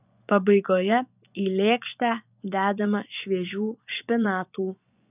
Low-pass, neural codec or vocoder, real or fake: 3.6 kHz; vocoder, 24 kHz, 100 mel bands, Vocos; fake